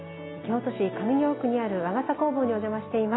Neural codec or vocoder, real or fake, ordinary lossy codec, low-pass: none; real; AAC, 16 kbps; 7.2 kHz